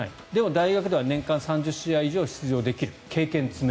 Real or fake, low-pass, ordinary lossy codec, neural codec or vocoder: real; none; none; none